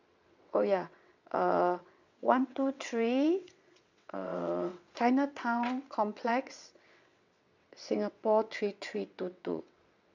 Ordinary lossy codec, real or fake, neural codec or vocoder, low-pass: none; fake; vocoder, 22.05 kHz, 80 mel bands, WaveNeXt; 7.2 kHz